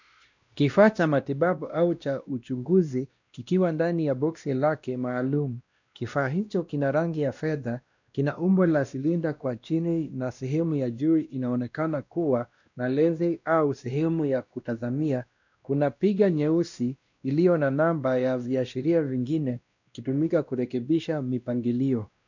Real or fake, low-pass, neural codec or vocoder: fake; 7.2 kHz; codec, 16 kHz, 1 kbps, X-Codec, WavLM features, trained on Multilingual LibriSpeech